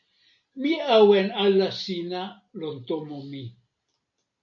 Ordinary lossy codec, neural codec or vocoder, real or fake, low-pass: MP3, 64 kbps; none; real; 7.2 kHz